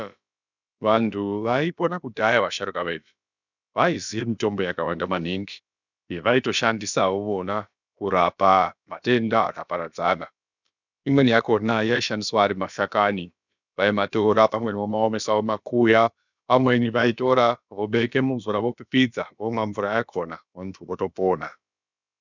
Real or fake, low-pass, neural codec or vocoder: fake; 7.2 kHz; codec, 16 kHz, about 1 kbps, DyCAST, with the encoder's durations